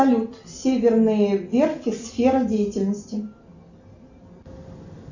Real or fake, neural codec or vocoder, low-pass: real; none; 7.2 kHz